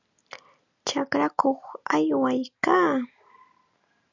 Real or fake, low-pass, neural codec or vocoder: real; 7.2 kHz; none